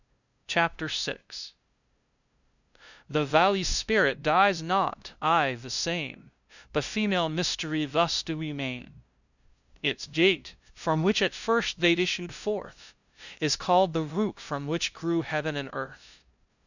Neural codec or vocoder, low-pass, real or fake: codec, 16 kHz, 0.5 kbps, FunCodec, trained on LibriTTS, 25 frames a second; 7.2 kHz; fake